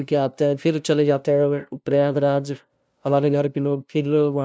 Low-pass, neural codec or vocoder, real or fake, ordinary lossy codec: none; codec, 16 kHz, 0.5 kbps, FunCodec, trained on LibriTTS, 25 frames a second; fake; none